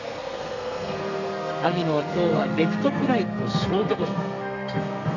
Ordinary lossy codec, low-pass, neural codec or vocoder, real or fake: none; 7.2 kHz; codec, 32 kHz, 1.9 kbps, SNAC; fake